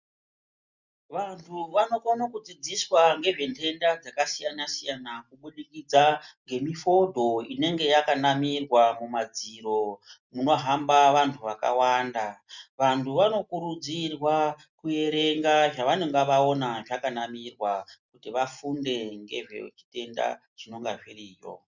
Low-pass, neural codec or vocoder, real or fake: 7.2 kHz; none; real